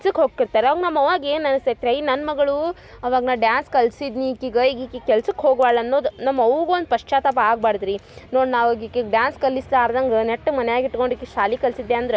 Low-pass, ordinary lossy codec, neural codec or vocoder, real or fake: none; none; none; real